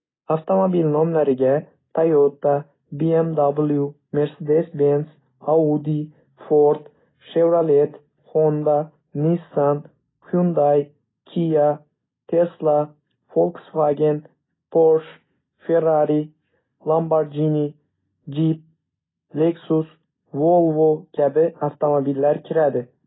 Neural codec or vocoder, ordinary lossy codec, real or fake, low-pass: none; AAC, 16 kbps; real; 7.2 kHz